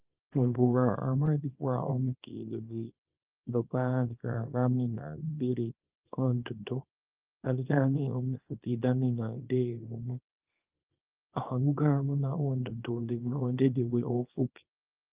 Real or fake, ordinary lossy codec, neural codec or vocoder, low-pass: fake; Opus, 32 kbps; codec, 24 kHz, 0.9 kbps, WavTokenizer, small release; 3.6 kHz